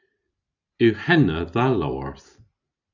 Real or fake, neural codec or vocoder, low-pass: real; none; 7.2 kHz